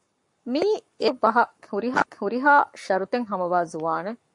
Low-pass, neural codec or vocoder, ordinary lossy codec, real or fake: 10.8 kHz; codec, 44.1 kHz, 7.8 kbps, Pupu-Codec; MP3, 48 kbps; fake